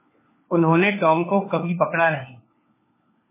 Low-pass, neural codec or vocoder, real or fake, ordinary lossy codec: 3.6 kHz; codec, 16 kHz, 4 kbps, FunCodec, trained on LibriTTS, 50 frames a second; fake; MP3, 16 kbps